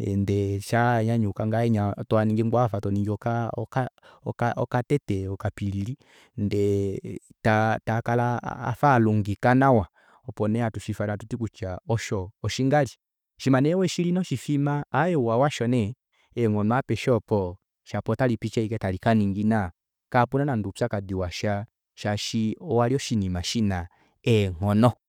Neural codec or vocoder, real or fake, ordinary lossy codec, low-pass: none; real; none; 19.8 kHz